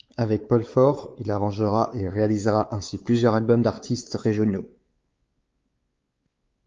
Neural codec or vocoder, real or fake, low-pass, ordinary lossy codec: codec, 16 kHz, 4 kbps, X-Codec, WavLM features, trained on Multilingual LibriSpeech; fake; 7.2 kHz; Opus, 32 kbps